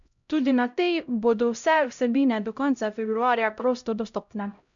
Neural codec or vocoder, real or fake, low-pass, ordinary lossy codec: codec, 16 kHz, 0.5 kbps, X-Codec, HuBERT features, trained on LibriSpeech; fake; 7.2 kHz; none